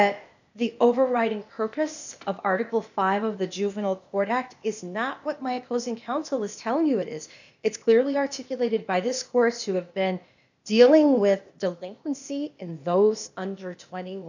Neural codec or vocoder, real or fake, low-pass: codec, 16 kHz, 0.8 kbps, ZipCodec; fake; 7.2 kHz